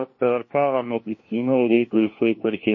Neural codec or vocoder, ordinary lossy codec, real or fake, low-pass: codec, 16 kHz, 1 kbps, FunCodec, trained on LibriTTS, 50 frames a second; MP3, 32 kbps; fake; 7.2 kHz